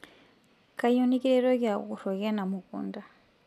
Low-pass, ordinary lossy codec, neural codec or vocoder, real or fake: 14.4 kHz; MP3, 96 kbps; none; real